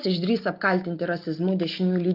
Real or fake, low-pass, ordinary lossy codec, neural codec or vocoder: real; 5.4 kHz; Opus, 32 kbps; none